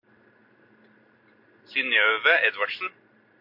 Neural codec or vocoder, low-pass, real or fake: none; 5.4 kHz; real